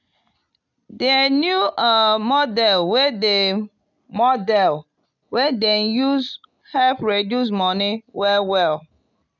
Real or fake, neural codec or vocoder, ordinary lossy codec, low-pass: real; none; none; 7.2 kHz